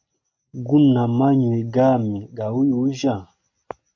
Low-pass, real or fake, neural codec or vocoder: 7.2 kHz; real; none